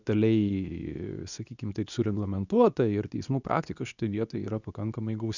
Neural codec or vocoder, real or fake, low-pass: codec, 24 kHz, 0.9 kbps, WavTokenizer, medium speech release version 2; fake; 7.2 kHz